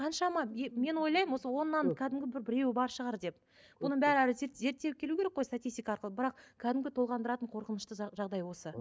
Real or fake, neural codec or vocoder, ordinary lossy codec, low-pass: real; none; none; none